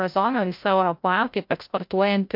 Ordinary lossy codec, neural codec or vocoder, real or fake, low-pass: MP3, 48 kbps; codec, 16 kHz, 0.5 kbps, FreqCodec, larger model; fake; 5.4 kHz